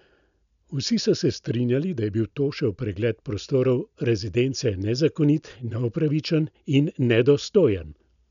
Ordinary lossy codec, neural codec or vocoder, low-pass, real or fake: none; none; 7.2 kHz; real